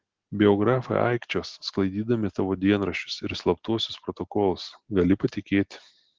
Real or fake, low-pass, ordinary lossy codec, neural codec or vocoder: real; 7.2 kHz; Opus, 16 kbps; none